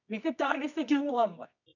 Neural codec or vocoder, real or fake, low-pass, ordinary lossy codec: codec, 24 kHz, 0.9 kbps, WavTokenizer, medium music audio release; fake; 7.2 kHz; none